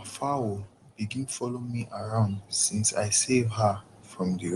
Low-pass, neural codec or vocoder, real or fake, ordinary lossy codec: 10.8 kHz; none; real; Opus, 24 kbps